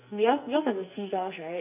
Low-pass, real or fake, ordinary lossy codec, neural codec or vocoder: 3.6 kHz; fake; none; codec, 44.1 kHz, 2.6 kbps, SNAC